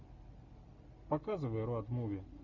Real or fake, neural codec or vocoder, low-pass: real; none; 7.2 kHz